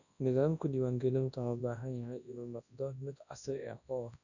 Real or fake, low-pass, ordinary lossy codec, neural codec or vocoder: fake; 7.2 kHz; none; codec, 24 kHz, 0.9 kbps, WavTokenizer, large speech release